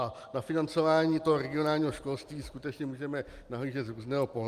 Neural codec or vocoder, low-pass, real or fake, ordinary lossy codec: none; 14.4 kHz; real; Opus, 32 kbps